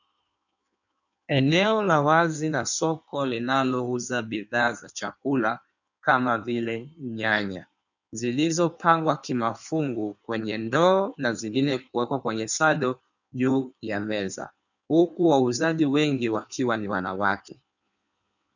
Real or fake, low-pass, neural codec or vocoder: fake; 7.2 kHz; codec, 16 kHz in and 24 kHz out, 1.1 kbps, FireRedTTS-2 codec